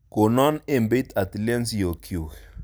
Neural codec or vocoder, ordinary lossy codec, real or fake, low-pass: none; none; real; none